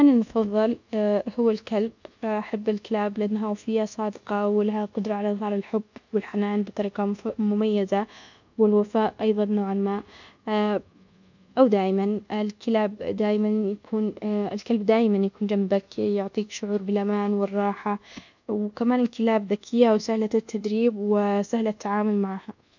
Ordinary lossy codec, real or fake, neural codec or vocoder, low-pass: none; fake; codec, 24 kHz, 1.2 kbps, DualCodec; 7.2 kHz